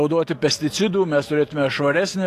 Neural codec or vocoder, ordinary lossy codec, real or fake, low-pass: autoencoder, 48 kHz, 128 numbers a frame, DAC-VAE, trained on Japanese speech; AAC, 48 kbps; fake; 14.4 kHz